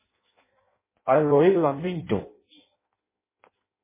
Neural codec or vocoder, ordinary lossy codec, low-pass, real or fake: codec, 16 kHz in and 24 kHz out, 0.6 kbps, FireRedTTS-2 codec; MP3, 16 kbps; 3.6 kHz; fake